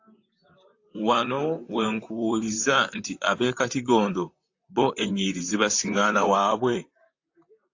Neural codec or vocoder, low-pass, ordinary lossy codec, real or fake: vocoder, 44.1 kHz, 128 mel bands, Pupu-Vocoder; 7.2 kHz; AAC, 48 kbps; fake